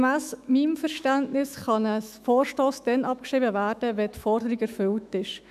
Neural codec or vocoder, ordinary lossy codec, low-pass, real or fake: autoencoder, 48 kHz, 128 numbers a frame, DAC-VAE, trained on Japanese speech; none; 14.4 kHz; fake